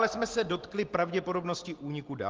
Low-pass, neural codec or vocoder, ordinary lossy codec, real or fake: 7.2 kHz; none; Opus, 16 kbps; real